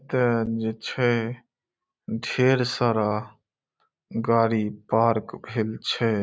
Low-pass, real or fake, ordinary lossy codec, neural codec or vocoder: none; real; none; none